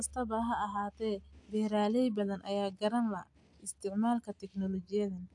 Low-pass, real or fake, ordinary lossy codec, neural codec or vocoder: 10.8 kHz; real; AAC, 64 kbps; none